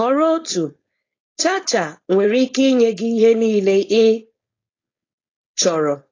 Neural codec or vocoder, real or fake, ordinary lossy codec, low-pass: codec, 16 kHz, 4.8 kbps, FACodec; fake; AAC, 32 kbps; 7.2 kHz